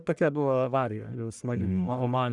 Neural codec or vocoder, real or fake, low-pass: codec, 44.1 kHz, 1.7 kbps, Pupu-Codec; fake; 10.8 kHz